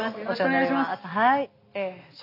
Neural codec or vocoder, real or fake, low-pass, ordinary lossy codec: none; real; 5.4 kHz; none